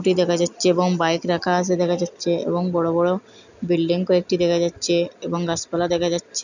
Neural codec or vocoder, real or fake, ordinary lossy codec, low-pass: none; real; none; 7.2 kHz